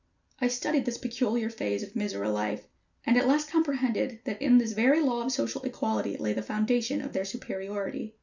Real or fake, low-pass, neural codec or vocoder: real; 7.2 kHz; none